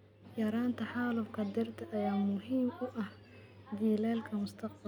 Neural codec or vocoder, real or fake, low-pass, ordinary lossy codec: none; real; 19.8 kHz; none